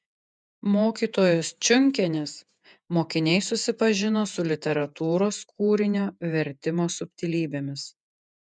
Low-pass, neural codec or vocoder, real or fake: 9.9 kHz; vocoder, 48 kHz, 128 mel bands, Vocos; fake